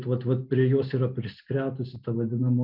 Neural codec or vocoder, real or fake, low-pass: none; real; 5.4 kHz